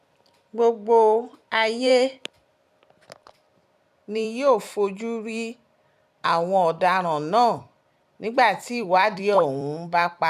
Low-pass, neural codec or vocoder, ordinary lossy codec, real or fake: 14.4 kHz; vocoder, 44.1 kHz, 128 mel bands every 256 samples, BigVGAN v2; none; fake